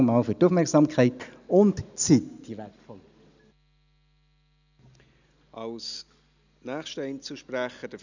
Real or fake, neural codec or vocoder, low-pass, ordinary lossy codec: real; none; 7.2 kHz; none